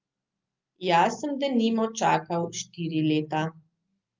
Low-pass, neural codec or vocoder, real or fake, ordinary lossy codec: 7.2 kHz; none; real; Opus, 24 kbps